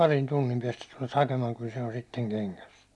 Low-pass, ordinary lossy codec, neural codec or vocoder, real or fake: none; none; none; real